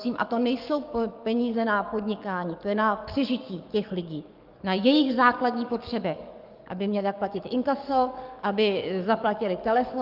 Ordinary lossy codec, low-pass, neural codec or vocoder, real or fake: Opus, 24 kbps; 5.4 kHz; codec, 44.1 kHz, 7.8 kbps, DAC; fake